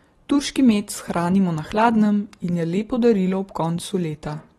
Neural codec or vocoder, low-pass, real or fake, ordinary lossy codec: none; 14.4 kHz; real; AAC, 32 kbps